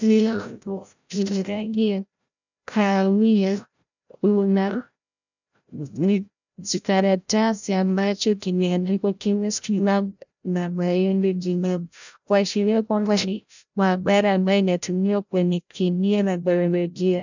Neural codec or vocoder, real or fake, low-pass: codec, 16 kHz, 0.5 kbps, FreqCodec, larger model; fake; 7.2 kHz